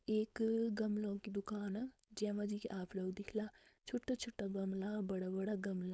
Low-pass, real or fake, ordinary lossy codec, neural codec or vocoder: none; fake; none; codec, 16 kHz, 4.8 kbps, FACodec